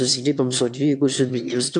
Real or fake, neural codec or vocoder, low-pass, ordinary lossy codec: fake; autoencoder, 22.05 kHz, a latent of 192 numbers a frame, VITS, trained on one speaker; 9.9 kHz; MP3, 64 kbps